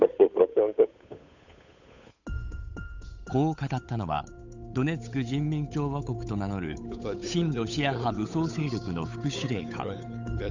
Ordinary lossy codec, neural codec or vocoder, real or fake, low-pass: none; codec, 16 kHz, 8 kbps, FunCodec, trained on Chinese and English, 25 frames a second; fake; 7.2 kHz